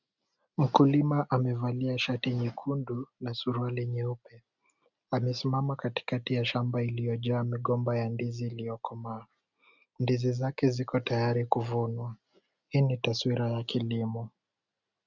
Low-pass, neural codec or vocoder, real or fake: 7.2 kHz; none; real